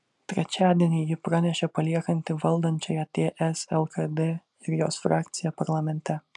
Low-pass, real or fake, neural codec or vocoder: 10.8 kHz; real; none